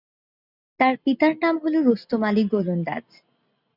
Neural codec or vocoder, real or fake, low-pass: none; real; 5.4 kHz